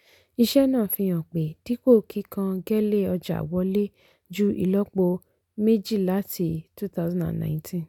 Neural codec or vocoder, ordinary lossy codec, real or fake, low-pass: none; none; real; 19.8 kHz